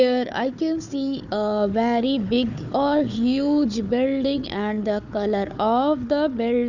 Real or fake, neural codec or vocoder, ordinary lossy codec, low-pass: fake; codec, 16 kHz, 4 kbps, FunCodec, trained on Chinese and English, 50 frames a second; none; 7.2 kHz